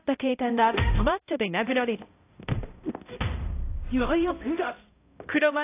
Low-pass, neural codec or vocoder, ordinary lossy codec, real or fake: 3.6 kHz; codec, 16 kHz, 0.5 kbps, X-Codec, HuBERT features, trained on balanced general audio; AAC, 24 kbps; fake